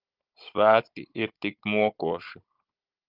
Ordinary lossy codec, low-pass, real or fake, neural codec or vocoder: Opus, 24 kbps; 5.4 kHz; fake; codec, 16 kHz, 16 kbps, FunCodec, trained on Chinese and English, 50 frames a second